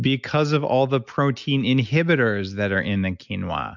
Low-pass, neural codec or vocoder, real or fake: 7.2 kHz; none; real